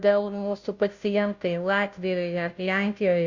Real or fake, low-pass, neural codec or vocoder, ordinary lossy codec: fake; 7.2 kHz; codec, 16 kHz, 0.5 kbps, FunCodec, trained on Chinese and English, 25 frames a second; Opus, 64 kbps